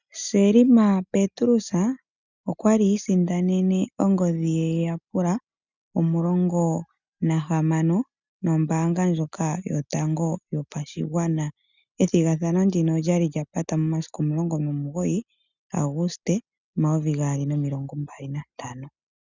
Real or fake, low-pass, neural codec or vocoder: real; 7.2 kHz; none